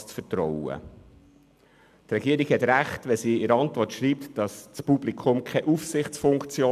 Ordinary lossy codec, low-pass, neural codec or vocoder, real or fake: none; 14.4 kHz; none; real